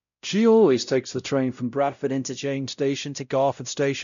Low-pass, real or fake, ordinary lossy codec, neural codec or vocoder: 7.2 kHz; fake; none; codec, 16 kHz, 0.5 kbps, X-Codec, WavLM features, trained on Multilingual LibriSpeech